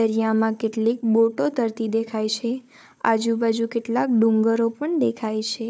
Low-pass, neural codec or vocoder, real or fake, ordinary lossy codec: none; codec, 16 kHz, 4 kbps, FunCodec, trained on Chinese and English, 50 frames a second; fake; none